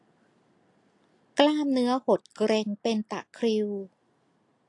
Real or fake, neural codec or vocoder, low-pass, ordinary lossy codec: real; none; 10.8 kHz; AAC, 48 kbps